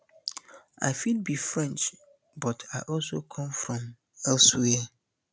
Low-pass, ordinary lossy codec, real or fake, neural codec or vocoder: none; none; real; none